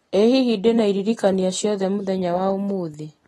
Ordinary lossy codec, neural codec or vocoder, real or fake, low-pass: AAC, 32 kbps; none; real; 19.8 kHz